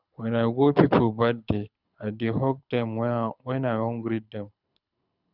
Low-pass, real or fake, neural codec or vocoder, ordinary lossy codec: 5.4 kHz; fake; codec, 24 kHz, 6 kbps, HILCodec; none